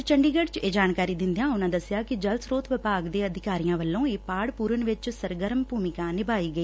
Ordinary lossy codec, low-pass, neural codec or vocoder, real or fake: none; none; none; real